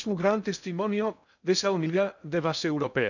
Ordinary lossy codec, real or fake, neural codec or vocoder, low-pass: none; fake; codec, 16 kHz in and 24 kHz out, 0.6 kbps, FocalCodec, streaming, 2048 codes; 7.2 kHz